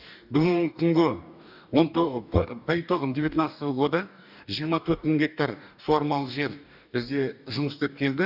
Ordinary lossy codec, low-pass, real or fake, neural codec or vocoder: none; 5.4 kHz; fake; codec, 44.1 kHz, 2.6 kbps, DAC